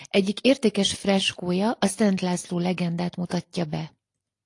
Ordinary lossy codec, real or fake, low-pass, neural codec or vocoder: AAC, 48 kbps; real; 10.8 kHz; none